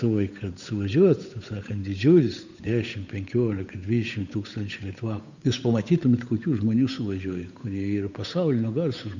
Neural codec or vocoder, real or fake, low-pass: codec, 16 kHz, 8 kbps, FunCodec, trained on Chinese and English, 25 frames a second; fake; 7.2 kHz